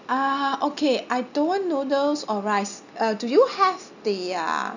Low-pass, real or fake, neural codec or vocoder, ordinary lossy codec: 7.2 kHz; real; none; none